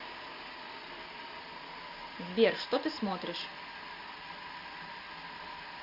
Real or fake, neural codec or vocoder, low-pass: real; none; 5.4 kHz